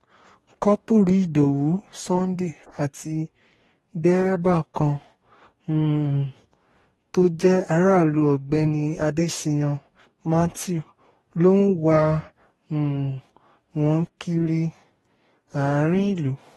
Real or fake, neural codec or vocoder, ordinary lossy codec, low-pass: fake; codec, 44.1 kHz, 2.6 kbps, DAC; AAC, 32 kbps; 19.8 kHz